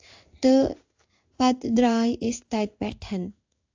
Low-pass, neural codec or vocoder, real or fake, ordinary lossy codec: 7.2 kHz; codec, 16 kHz in and 24 kHz out, 1 kbps, XY-Tokenizer; fake; AAC, 48 kbps